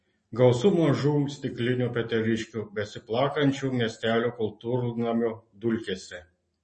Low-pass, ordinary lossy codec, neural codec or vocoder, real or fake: 10.8 kHz; MP3, 32 kbps; none; real